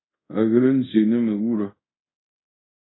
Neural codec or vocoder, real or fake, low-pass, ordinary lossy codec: codec, 24 kHz, 0.5 kbps, DualCodec; fake; 7.2 kHz; AAC, 16 kbps